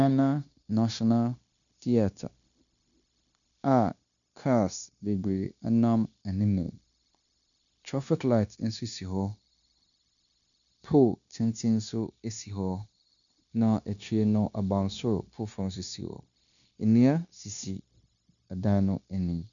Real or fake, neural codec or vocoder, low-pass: fake; codec, 16 kHz, 0.9 kbps, LongCat-Audio-Codec; 7.2 kHz